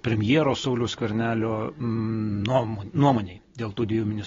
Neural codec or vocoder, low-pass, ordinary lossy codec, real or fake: none; 7.2 kHz; AAC, 24 kbps; real